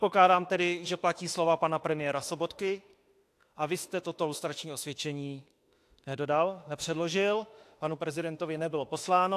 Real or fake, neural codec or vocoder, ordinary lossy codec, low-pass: fake; autoencoder, 48 kHz, 32 numbers a frame, DAC-VAE, trained on Japanese speech; AAC, 64 kbps; 14.4 kHz